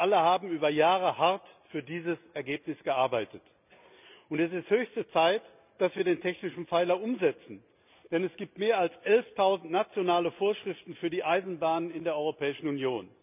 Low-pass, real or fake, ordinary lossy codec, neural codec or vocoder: 3.6 kHz; real; none; none